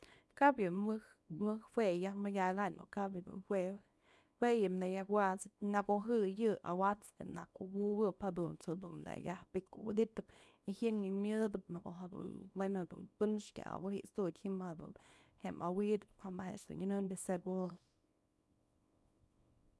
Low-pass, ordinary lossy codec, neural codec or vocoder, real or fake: none; none; codec, 24 kHz, 0.9 kbps, WavTokenizer, small release; fake